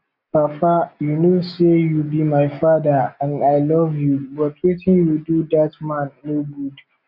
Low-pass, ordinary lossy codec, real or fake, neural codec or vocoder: 5.4 kHz; none; real; none